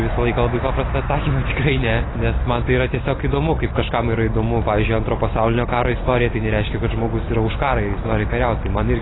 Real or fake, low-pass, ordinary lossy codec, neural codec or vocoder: real; 7.2 kHz; AAC, 16 kbps; none